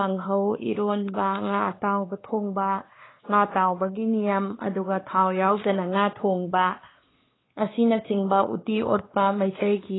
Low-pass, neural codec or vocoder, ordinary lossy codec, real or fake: 7.2 kHz; codec, 16 kHz, 2 kbps, X-Codec, WavLM features, trained on Multilingual LibriSpeech; AAC, 16 kbps; fake